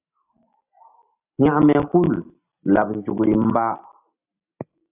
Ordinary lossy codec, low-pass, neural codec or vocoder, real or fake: AAC, 32 kbps; 3.6 kHz; none; real